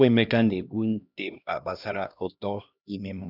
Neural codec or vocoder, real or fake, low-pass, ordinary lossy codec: codec, 16 kHz, 1 kbps, X-Codec, HuBERT features, trained on LibriSpeech; fake; 5.4 kHz; none